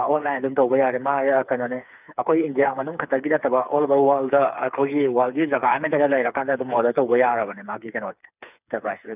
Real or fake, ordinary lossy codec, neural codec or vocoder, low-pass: fake; none; codec, 16 kHz, 4 kbps, FreqCodec, smaller model; 3.6 kHz